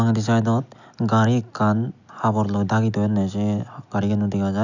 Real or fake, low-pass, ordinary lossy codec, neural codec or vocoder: real; 7.2 kHz; none; none